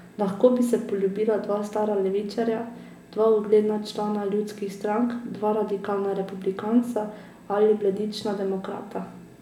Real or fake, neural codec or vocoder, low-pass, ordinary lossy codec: real; none; 19.8 kHz; none